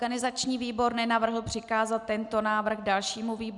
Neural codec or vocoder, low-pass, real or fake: none; 10.8 kHz; real